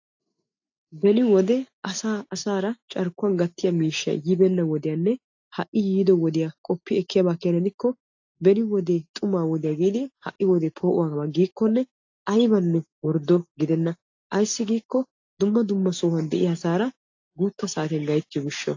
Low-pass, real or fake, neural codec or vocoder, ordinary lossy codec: 7.2 kHz; real; none; AAC, 48 kbps